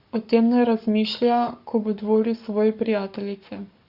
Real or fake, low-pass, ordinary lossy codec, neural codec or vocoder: fake; 5.4 kHz; Opus, 64 kbps; codec, 44.1 kHz, 7.8 kbps, Pupu-Codec